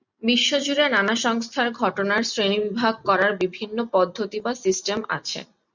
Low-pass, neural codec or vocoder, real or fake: 7.2 kHz; none; real